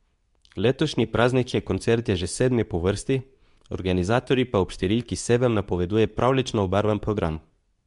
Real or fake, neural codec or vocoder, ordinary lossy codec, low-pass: fake; codec, 24 kHz, 0.9 kbps, WavTokenizer, medium speech release version 2; MP3, 96 kbps; 10.8 kHz